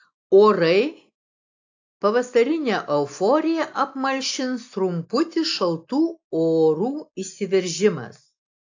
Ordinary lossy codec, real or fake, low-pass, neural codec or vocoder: AAC, 48 kbps; real; 7.2 kHz; none